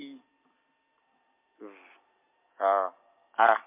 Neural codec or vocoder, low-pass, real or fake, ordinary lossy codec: none; 3.6 kHz; real; MP3, 24 kbps